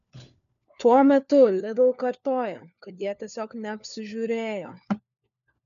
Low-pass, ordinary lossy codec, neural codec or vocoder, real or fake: 7.2 kHz; MP3, 96 kbps; codec, 16 kHz, 4 kbps, FunCodec, trained on LibriTTS, 50 frames a second; fake